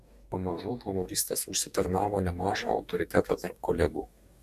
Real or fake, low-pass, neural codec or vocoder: fake; 14.4 kHz; codec, 44.1 kHz, 2.6 kbps, DAC